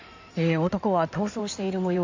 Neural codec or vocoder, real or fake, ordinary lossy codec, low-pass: codec, 16 kHz in and 24 kHz out, 2.2 kbps, FireRedTTS-2 codec; fake; AAC, 48 kbps; 7.2 kHz